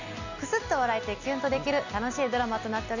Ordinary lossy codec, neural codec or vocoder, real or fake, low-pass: none; none; real; 7.2 kHz